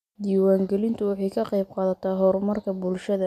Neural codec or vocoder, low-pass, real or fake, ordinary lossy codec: none; 14.4 kHz; real; MP3, 96 kbps